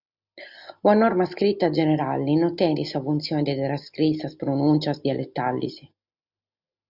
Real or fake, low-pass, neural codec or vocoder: real; 5.4 kHz; none